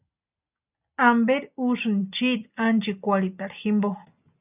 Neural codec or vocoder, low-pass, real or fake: none; 3.6 kHz; real